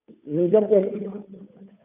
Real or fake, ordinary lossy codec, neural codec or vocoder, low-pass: fake; none; codec, 16 kHz, 2 kbps, FunCodec, trained on Chinese and English, 25 frames a second; 3.6 kHz